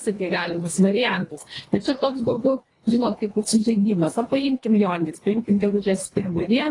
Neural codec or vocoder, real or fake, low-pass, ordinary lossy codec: codec, 24 kHz, 1.5 kbps, HILCodec; fake; 10.8 kHz; AAC, 32 kbps